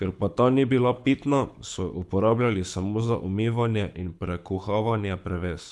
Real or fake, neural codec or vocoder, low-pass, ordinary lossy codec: fake; codec, 24 kHz, 6 kbps, HILCodec; none; none